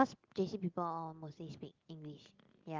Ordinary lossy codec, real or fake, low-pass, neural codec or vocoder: Opus, 32 kbps; real; 7.2 kHz; none